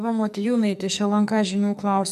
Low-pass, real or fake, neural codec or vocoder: 14.4 kHz; fake; codec, 44.1 kHz, 2.6 kbps, SNAC